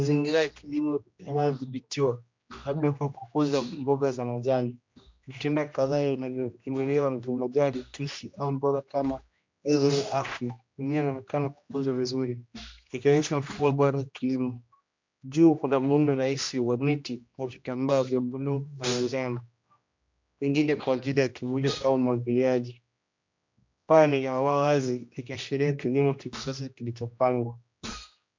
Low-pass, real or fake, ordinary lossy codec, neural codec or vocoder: 7.2 kHz; fake; MP3, 64 kbps; codec, 16 kHz, 1 kbps, X-Codec, HuBERT features, trained on general audio